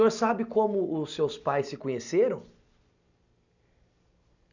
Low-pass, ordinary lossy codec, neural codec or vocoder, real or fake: 7.2 kHz; none; none; real